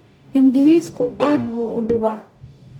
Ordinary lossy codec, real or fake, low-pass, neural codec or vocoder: none; fake; 19.8 kHz; codec, 44.1 kHz, 0.9 kbps, DAC